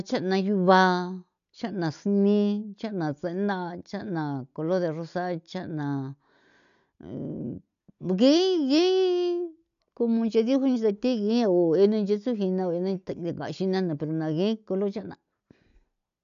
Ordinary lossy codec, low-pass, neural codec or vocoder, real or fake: none; 7.2 kHz; none; real